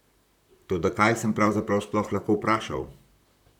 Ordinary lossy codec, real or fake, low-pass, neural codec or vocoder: none; fake; 19.8 kHz; vocoder, 44.1 kHz, 128 mel bands, Pupu-Vocoder